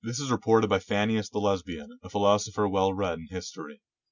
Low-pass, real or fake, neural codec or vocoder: 7.2 kHz; real; none